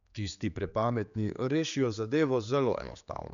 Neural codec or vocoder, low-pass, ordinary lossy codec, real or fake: codec, 16 kHz, 4 kbps, X-Codec, HuBERT features, trained on general audio; 7.2 kHz; MP3, 96 kbps; fake